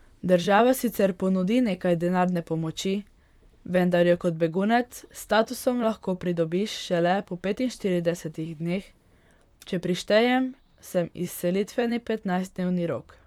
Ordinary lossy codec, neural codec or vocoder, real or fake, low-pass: none; vocoder, 44.1 kHz, 128 mel bands, Pupu-Vocoder; fake; 19.8 kHz